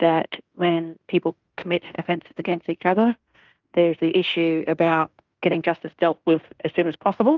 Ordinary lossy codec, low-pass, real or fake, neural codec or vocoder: Opus, 24 kbps; 7.2 kHz; fake; codec, 16 kHz in and 24 kHz out, 0.9 kbps, LongCat-Audio-Codec, fine tuned four codebook decoder